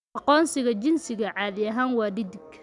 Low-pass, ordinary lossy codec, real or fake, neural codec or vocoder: 10.8 kHz; none; real; none